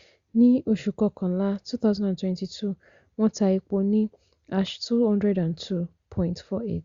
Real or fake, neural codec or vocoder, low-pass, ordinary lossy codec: real; none; 7.2 kHz; Opus, 64 kbps